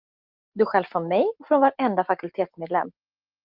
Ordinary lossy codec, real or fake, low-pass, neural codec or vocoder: Opus, 24 kbps; real; 5.4 kHz; none